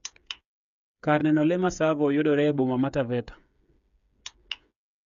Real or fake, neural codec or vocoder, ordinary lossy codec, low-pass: fake; codec, 16 kHz, 8 kbps, FreqCodec, smaller model; AAC, 96 kbps; 7.2 kHz